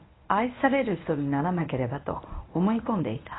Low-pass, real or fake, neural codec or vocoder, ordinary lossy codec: 7.2 kHz; fake; codec, 24 kHz, 0.9 kbps, WavTokenizer, medium speech release version 1; AAC, 16 kbps